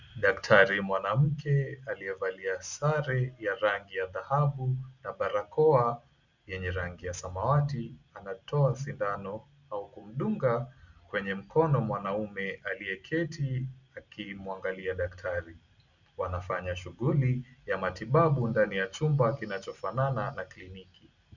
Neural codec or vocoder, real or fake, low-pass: none; real; 7.2 kHz